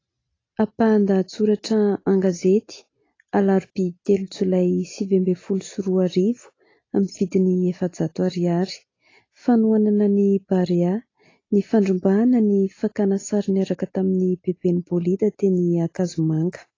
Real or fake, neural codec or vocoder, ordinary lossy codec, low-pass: real; none; AAC, 32 kbps; 7.2 kHz